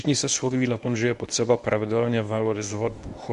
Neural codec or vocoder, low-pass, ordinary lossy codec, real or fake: codec, 24 kHz, 0.9 kbps, WavTokenizer, medium speech release version 1; 10.8 kHz; Opus, 64 kbps; fake